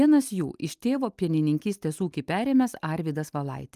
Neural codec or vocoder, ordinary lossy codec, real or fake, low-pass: none; Opus, 32 kbps; real; 14.4 kHz